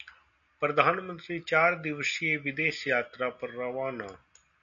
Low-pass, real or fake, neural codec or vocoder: 7.2 kHz; real; none